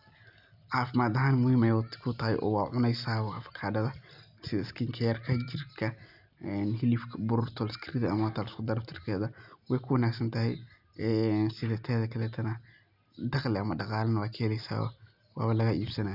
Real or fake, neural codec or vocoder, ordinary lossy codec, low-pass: real; none; none; 5.4 kHz